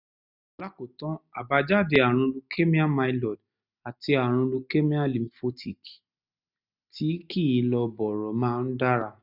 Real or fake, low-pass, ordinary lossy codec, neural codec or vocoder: real; 5.4 kHz; none; none